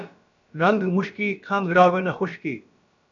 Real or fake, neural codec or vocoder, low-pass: fake; codec, 16 kHz, about 1 kbps, DyCAST, with the encoder's durations; 7.2 kHz